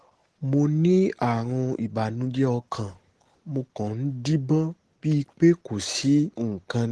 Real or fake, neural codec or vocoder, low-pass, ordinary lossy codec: real; none; 10.8 kHz; Opus, 16 kbps